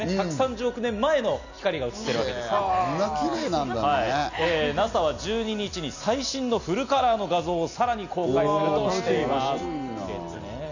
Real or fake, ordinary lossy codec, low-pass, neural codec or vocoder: real; none; 7.2 kHz; none